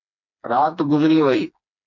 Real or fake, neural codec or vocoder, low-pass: fake; codec, 16 kHz, 2 kbps, FreqCodec, smaller model; 7.2 kHz